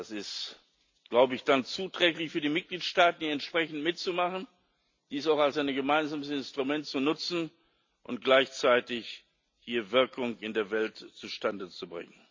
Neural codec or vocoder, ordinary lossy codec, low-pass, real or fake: none; MP3, 64 kbps; 7.2 kHz; real